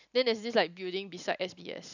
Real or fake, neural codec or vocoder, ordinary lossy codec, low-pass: real; none; none; 7.2 kHz